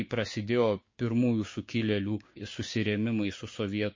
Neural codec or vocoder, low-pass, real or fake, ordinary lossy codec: codec, 16 kHz, 6 kbps, DAC; 7.2 kHz; fake; MP3, 32 kbps